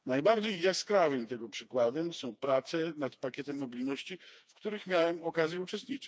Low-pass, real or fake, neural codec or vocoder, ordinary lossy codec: none; fake; codec, 16 kHz, 2 kbps, FreqCodec, smaller model; none